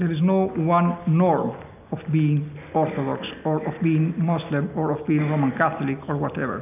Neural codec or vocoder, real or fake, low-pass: none; real; 3.6 kHz